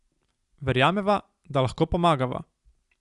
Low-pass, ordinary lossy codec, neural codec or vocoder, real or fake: 10.8 kHz; Opus, 64 kbps; none; real